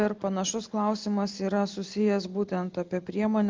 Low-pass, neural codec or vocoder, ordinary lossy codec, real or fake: 7.2 kHz; none; Opus, 24 kbps; real